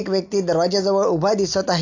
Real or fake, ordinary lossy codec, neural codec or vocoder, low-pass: real; AAC, 48 kbps; none; 7.2 kHz